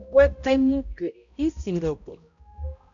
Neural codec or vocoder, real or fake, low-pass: codec, 16 kHz, 0.5 kbps, X-Codec, HuBERT features, trained on balanced general audio; fake; 7.2 kHz